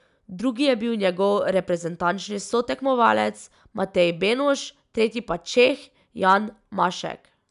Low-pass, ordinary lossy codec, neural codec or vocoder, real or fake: 10.8 kHz; none; none; real